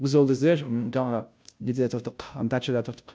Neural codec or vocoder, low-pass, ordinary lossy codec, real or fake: codec, 16 kHz, 0.5 kbps, FunCodec, trained on Chinese and English, 25 frames a second; none; none; fake